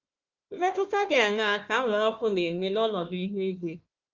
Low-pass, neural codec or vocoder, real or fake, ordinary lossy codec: 7.2 kHz; codec, 16 kHz, 1 kbps, FunCodec, trained on Chinese and English, 50 frames a second; fake; Opus, 32 kbps